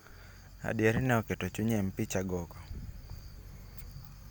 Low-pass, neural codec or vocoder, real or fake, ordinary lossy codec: none; none; real; none